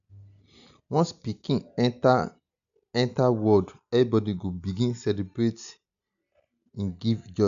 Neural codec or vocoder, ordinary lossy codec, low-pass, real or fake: none; MP3, 96 kbps; 7.2 kHz; real